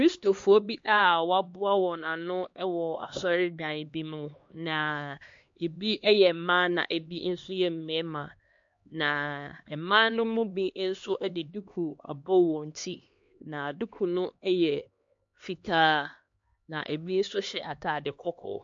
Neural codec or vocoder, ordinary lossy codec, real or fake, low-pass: codec, 16 kHz, 2 kbps, X-Codec, HuBERT features, trained on LibriSpeech; MP3, 48 kbps; fake; 7.2 kHz